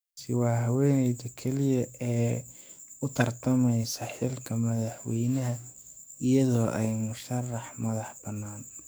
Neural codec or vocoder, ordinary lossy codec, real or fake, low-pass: codec, 44.1 kHz, 7.8 kbps, DAC; none; fake; none